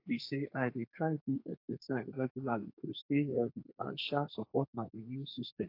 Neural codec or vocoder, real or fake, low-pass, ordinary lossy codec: codec, 32 kHz, 1.9 kbps, SNAC; fake; 5.4 kHz; AAC, 32 kbps